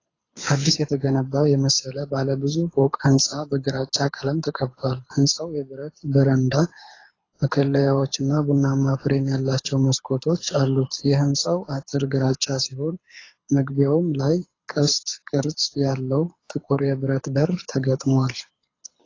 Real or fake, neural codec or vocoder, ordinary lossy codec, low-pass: fake; codec, 24 kHz, 6 kbps, HILCodec; AAC, 32 kbps; 7.2 kHz